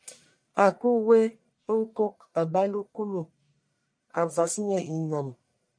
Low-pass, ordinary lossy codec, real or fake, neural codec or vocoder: 9.9 kHz; MP3, 96 kbps; fake; codec, 44.1 kHz, 1.7 kbps, Pupu-Codec